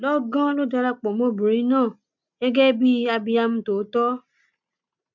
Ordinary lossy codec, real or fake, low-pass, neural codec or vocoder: none; real; 7.2 kHz; none